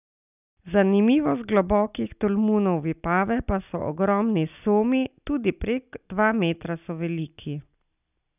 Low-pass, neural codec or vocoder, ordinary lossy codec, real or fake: 3.6 kHz; none; none; real